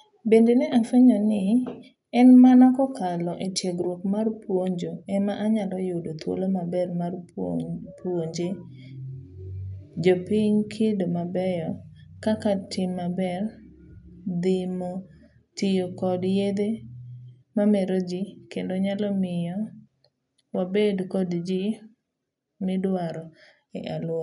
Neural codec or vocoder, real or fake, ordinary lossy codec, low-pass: none; real; none; 10.8 kHz